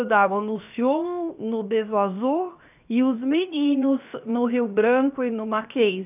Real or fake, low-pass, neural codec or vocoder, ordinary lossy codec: fake; 3.6 kHz; codec, 16 kHz, 0.7 kbps, FocalCodec; none